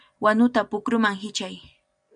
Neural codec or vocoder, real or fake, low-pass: none; real; 9.9 kHz